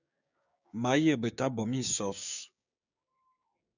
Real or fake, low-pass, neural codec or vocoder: fake; 7.2 kHz; codec, 16 kHz, 6 kbps, DAC